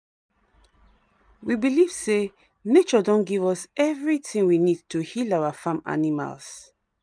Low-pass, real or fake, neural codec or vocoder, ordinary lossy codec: 9.9 kHz; real; none; none